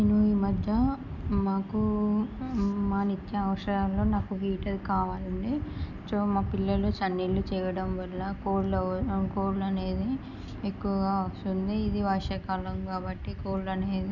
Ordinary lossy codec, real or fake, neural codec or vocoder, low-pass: none; real; none; 7.2 kHz